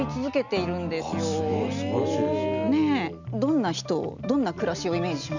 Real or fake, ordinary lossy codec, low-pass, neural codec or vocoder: real; none; 7.2 kHz; none